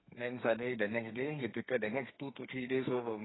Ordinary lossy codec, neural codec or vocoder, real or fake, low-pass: AAC, 16 kbps; codec, 32 kHz, 1.9 kbps, SNAC; fake; 7.2 kHz